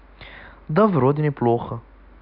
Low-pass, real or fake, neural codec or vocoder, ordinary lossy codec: 5.4 kHz; real; none; none